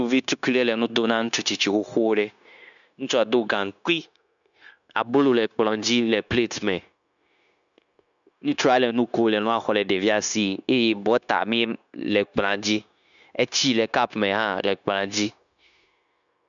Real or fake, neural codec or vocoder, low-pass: fake; codec, 16 kHz, 0.9 kbps, LongCat-Audio-Codec; 7.2 kHz